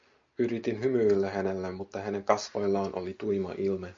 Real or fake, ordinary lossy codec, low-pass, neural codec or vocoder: real; MP3, 48 kbps; 7.2 kHz; none